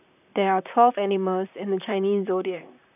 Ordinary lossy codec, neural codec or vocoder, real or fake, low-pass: none; vocoder, 44.1 kHz, 128 mel bands, Pupu-Vocoder; fake; 3.6 kHz